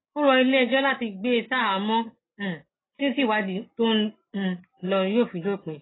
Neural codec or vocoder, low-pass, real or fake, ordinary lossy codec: none; 7.2 kHz; real; AAC, 16 kbps